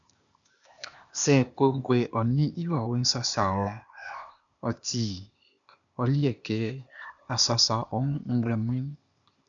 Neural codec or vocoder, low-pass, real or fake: codec, 16 kHz, 0.8 kbps, ZipCodec; 7.2 kHz; fake